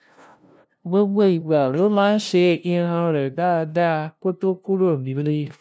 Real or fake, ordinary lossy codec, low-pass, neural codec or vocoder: fake; none; none; codec, 16 kHz, 0.5 kbps, FunCodec, trained on LibriTTS, 25 frames a second